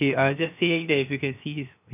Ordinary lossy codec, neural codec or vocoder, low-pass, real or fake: none; codec, 16 kHz, 0.7 kbps, FocalCodec; 3.6 kHz; fake